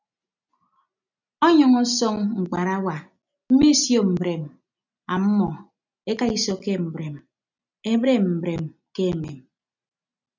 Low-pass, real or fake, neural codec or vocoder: 7.2 kHz; real; none